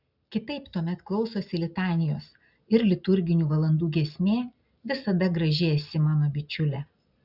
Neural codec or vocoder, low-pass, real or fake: none; 5.4 kHz; real